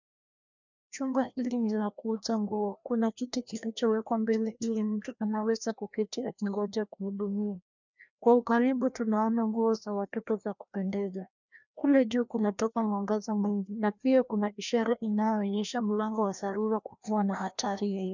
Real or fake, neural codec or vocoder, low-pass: fake; codec, 16 kHz, 1 kbps, FreqCodec, larger model; 7.2 kHz